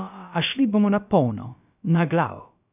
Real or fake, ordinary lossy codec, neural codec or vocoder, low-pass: fake; none; codec, 16 kHz, about 1 kbps, DyCAST, with the encoder's durations; 3.6 kHz